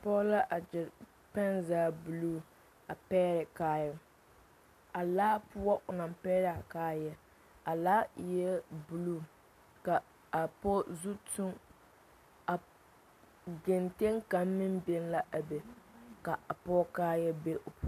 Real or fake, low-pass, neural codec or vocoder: real; 14.4 kHz; none